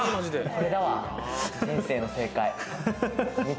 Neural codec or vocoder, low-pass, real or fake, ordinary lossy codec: none; none; real; none